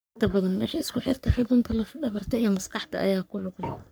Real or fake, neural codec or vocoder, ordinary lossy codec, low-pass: fake; codec, 44.1 kHz, 3.4 kbps, Pupu-Codec; none; none